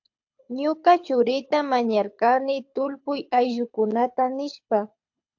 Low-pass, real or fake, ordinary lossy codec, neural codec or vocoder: 7.2 kHz; fake; AAC, 48 kbps; codec, 24 kHz, 6 kbps, HILCodec